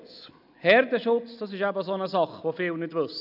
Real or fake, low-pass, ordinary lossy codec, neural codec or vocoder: real; 5.4 kHz; none; none